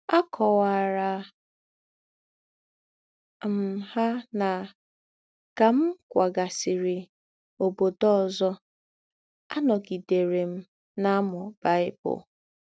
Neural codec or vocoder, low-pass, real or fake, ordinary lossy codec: none; none; real; none